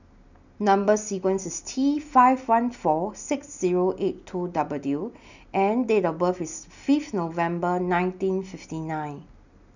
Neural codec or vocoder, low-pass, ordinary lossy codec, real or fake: none; 7.2 kHz; none; real